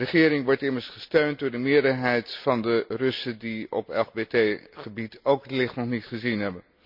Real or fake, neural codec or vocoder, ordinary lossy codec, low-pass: real; none; MP3, 48 kbps; 5.4 kHz